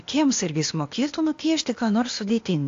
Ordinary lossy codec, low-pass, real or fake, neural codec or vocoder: AAC, 48 kbps; 7.2 kHz; fake; codec, 16 kHz, 0.8 kbps, ZipCodec